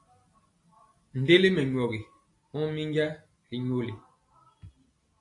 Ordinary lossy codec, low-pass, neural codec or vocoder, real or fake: AAC, 48 kbps; 10.8 kHz; none; real